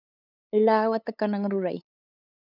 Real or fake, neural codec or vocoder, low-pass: fake; codec, 16 kHz, 8 kbps, FunCodec, trained on LibriTTS, 25 frames a second; 5.4 kHz